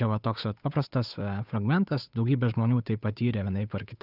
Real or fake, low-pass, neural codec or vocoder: fake; 5.4 kHz; codec, 16 kHz, 8 kbps, FunCodec, trained on Chinese and English, 25 frames a second